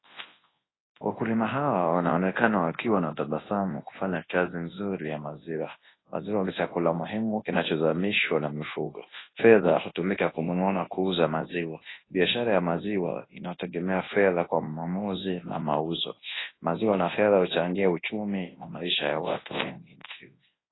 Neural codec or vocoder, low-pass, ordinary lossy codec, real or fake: codec, 24 kHz, 0.9 kbps, WavTokenizer, large speech release; 7.2 kHz; AAC, 16 kbps; fake